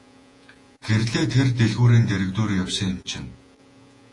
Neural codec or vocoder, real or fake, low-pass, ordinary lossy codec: vocoder, 48 kHz, 128 mel bands, Vocos; fake; 10.8 kHz; AAC, 48 kbps